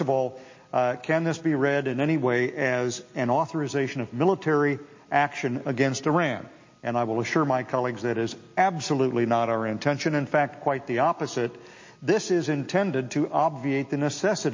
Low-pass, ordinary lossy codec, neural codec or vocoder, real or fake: 7.2 kHz; MP3, 32 kbps; none; real